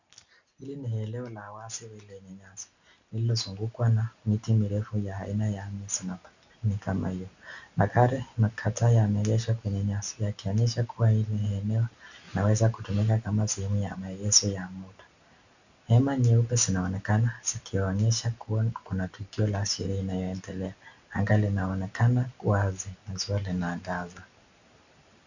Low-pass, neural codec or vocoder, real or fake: 7.2 kHz; none; real